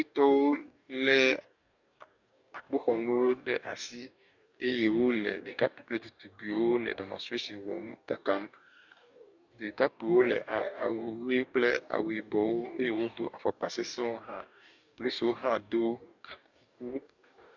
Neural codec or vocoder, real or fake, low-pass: codec, 44.1 kHz, 2.6 kbps, DAC; fake; 7.2 kHz